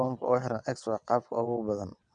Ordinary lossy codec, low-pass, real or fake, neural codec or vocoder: none; 9.9 kHz; fake; vocoder, 22.05 kHz, 80 mel bands, WaveNeXt